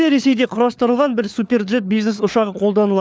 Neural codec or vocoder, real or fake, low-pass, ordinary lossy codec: codec, 16 kHz, 4 kbps, FunCodec, trained on LibriTTS, 50 frames a second; fake; none; none